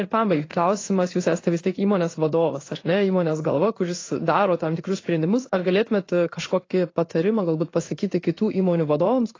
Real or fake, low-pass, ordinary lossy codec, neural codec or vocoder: fake; 7.2 kHz; AAC, 32 kbps; codec, 16 kHz in and 24 kHz out, 1 kbps, XY-Tokenizer